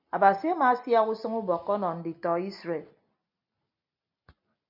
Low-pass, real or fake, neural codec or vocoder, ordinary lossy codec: 5.4 kHz; real; none; MP3, 48 kbps